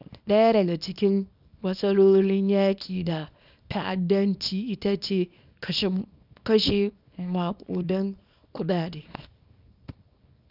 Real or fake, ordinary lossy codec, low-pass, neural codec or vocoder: fake; none; 5.4 kHz; codec, 24 kHz, 0.9 kbps, WavTokenizer, small release